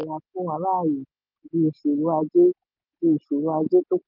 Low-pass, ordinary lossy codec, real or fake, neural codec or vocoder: 5.4 kHz; none; real; none